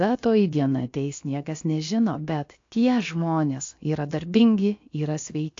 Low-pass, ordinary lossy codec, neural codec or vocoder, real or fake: 7.2 kHz; AAC, 48 kbps; codec, 16 kHz, about 1 kbps, DyCAST, with the encoder's durations; fake